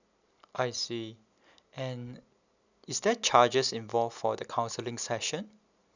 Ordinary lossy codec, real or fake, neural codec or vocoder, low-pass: none; real; none; 7.2 kHz